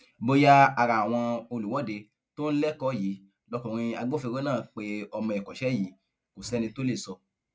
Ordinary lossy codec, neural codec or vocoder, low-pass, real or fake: none; none; none; real